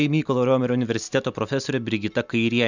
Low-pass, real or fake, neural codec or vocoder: 7.2 kHz; real; none